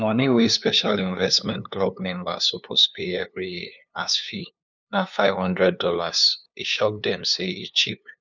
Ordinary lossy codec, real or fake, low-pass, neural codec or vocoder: none; fake; 7.2 kHz; codec, 16 kHz, 2 kbps, FunCodec, trained on LibriTTS, 25 frames a second